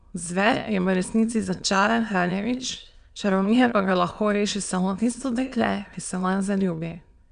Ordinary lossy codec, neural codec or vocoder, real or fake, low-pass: MP3, 96 kbps; autoencoder, 22.05 kHz, a latent of 192 numbers a frame, VITS, trained on many speakers; fake; 9.9 kHz